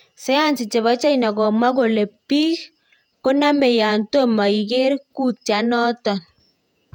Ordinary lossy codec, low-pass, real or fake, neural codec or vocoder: none; 19.8 kHz; fake; vocoder, 48 kHz, 128 mel bands, Vocos